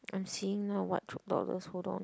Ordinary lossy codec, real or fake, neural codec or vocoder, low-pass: none; real; none; none